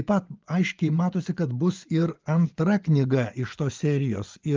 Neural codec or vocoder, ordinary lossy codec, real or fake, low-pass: vocoder, 24 kHz, 100 mel bands, Vocos; Opus, 32 kbps; fake; 7.2 kHz